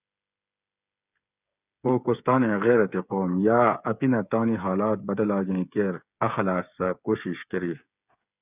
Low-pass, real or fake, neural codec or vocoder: 3.6 kHz; fake; codec, 16 kHz, 8 kbps, FreqCodec, smaller model